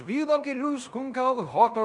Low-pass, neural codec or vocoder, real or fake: 10.8 kHz; codec, 16 kHz in and 24 kHz out, 0.9 kbps, LongCat-Audio-Codec, fine tuned four codebook decoder; fake